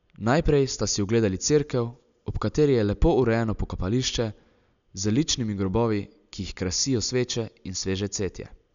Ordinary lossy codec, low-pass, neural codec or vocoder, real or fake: none; 7.2 kHz; none; real